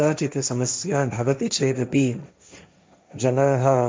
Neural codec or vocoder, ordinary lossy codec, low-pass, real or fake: codec, 16 kHz, 1.1 kbps, Voila-Tokenizer; none; none; fake